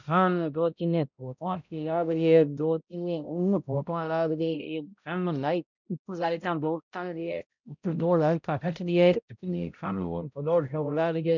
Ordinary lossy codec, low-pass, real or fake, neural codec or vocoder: none; 7.2 kHz; fake; codec, 16 kHz, 0.5 kbps, X-Codec, HuBERT features, trained on balanced general audio